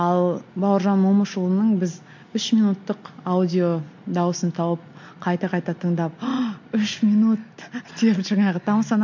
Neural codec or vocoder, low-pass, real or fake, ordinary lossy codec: none; 7.2 kHz; real; MP3, 48 kbps